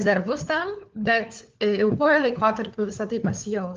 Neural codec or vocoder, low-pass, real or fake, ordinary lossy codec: codec, 16 kHz, 4 kbps, FunCodec, trained on LibriTTS, 50 frames a second; 7.2 kHz; fake; Opus, 32 kbps